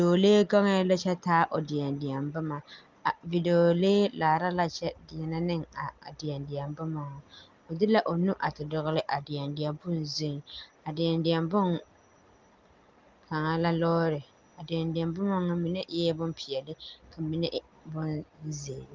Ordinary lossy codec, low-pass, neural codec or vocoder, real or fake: Opus, 32 kbps; 7.2 kHz; none; real